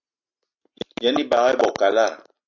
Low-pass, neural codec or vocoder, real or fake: 7.2 kHz; none; real